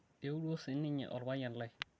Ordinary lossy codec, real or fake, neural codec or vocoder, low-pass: none; real; none; none